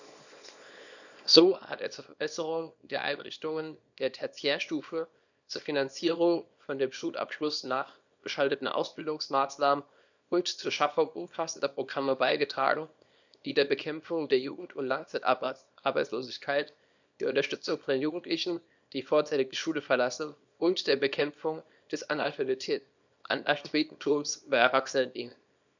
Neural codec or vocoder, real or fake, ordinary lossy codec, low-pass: codec, 24 kHz, 0.9 kbps, WavTokenizer, small release; fake; none; 7.2 kHz